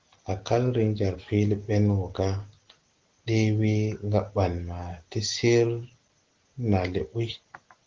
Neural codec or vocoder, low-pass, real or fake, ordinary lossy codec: none; 7.2 kHz; real; Opus, 16 kbps